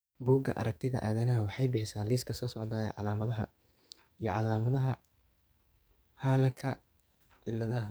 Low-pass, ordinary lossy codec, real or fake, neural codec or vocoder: none; none; fake; codec, 44.1 kHz, 2.6 kbps, SNAC